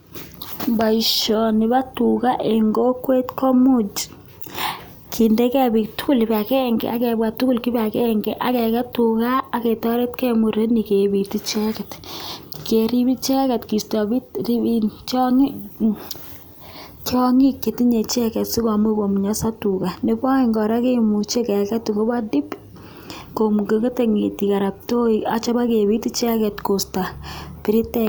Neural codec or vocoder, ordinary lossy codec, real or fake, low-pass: none; none; real; none